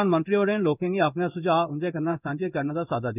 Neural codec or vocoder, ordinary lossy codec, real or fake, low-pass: codec, 16 kHz in and 24 kHz out, 1 kbps, XY-Tokenizer; none; fake; 3.6 kHz